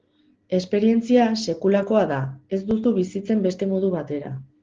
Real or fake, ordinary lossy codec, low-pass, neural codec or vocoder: real; Opus, 16 kbps; 7.2 kHz; none